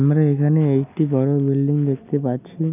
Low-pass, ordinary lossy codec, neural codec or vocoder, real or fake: 3.6 kHz; none; none; real